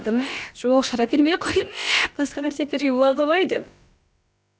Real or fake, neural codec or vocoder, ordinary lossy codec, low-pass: fake; codec, 16 kHz, about 1 kbps, DyCAST, with the encoder's durations; none; none